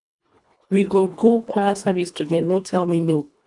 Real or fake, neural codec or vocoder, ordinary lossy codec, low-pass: fake; codec, 24 kHz, 1.5 kbps, HILCodec; none; 10.8 kHz